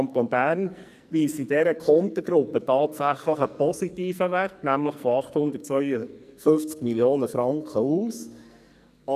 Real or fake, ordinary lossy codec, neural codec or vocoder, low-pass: fake; none; codec, 44.1 kHz, 2.6 kbps, SNAC; 14.4 kHz